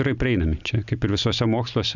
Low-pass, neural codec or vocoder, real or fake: 7.2 kHz; none; real